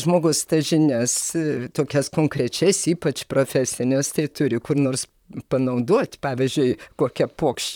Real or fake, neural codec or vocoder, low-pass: fake; vocoder, 44.1 kHz, 128 mel bands, Pupu-Vocoder; 19.8 kHz